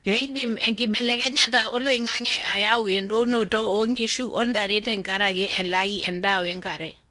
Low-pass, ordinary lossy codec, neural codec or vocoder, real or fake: 10.8 kHz; none; codec, 16 kHz in and 24 kHz out, 0.8 kbps, FocalCodec, streaming, 65536 codes; fake